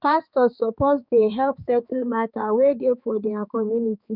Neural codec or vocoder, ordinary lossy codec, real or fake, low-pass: codec, 16 kHz, 4 kbps, X-Codec, HuBERT features, trained on balanced general audio; none; fake; 5.4 kHz